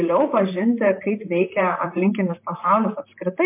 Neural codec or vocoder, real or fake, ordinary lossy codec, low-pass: vocoder, 44.1 kHz, 128 mel bands, Pupu-Vocoder; fake; MP3, 24 kbps; 3.6 kHz